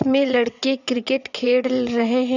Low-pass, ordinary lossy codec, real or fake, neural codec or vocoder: 7.2 kHz; none; fake; vocoder, 22.05 kHz, 80 mel bands, WaveNeXt